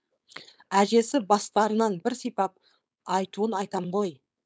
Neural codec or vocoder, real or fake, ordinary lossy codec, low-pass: codec, 16 kHz, 4.8 kbps, FACodec; fake; none; none